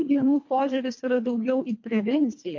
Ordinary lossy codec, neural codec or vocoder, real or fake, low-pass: MP3, 48 kbps; codec, 24 kHz, 1.5 kbps, HILCodec; fake; 7.2 kHz